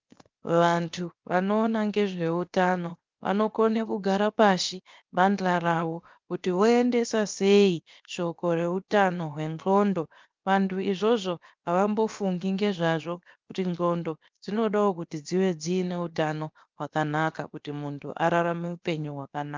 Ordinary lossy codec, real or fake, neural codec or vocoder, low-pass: Opus, 32 kbps; fake; codec, 16 kHz, 0.7 kbps, FocalCodec; 7.2 kHz